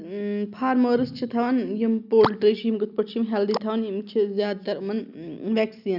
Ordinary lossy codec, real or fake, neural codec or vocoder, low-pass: none; real; none; 5.4 kHz